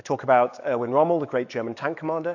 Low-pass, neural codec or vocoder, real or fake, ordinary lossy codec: 7.2 kHz; autoencoder, 48 kHz, 128 numbers a frame, DAC-VAE, trained on Japanese speech; fake; AAC, 48 kbps